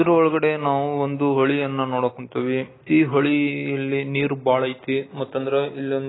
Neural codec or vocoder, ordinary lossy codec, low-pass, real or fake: none; AAC, 16 kbps; 7.2 kHz; real